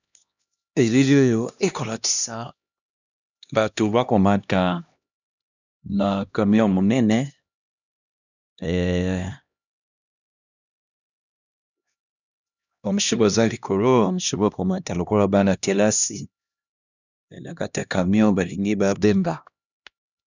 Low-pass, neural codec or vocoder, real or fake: 7.2 kHz; codec, 16 kHz, 1 kbps, X-Codec, HuBERT features, trained on LibriSpeech; fake